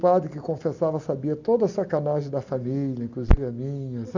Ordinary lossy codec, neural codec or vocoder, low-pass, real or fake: none; none; 7.2 kHz; real